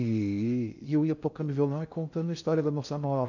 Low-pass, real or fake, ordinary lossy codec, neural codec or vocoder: 7.2 kHz; fake; none; codec, 16 kHz in and 24 kHz out, 0.6 kbps, FocalCodec, streaming, 2048 codes